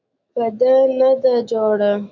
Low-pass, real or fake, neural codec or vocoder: 7.2 kHz; fake; autoencoder, 48 kHz, 128 numbers a frame, DAC-VAE, trained on Japanese speech